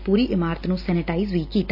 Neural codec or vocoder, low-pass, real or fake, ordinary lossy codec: none; 5.4 kHz; real; none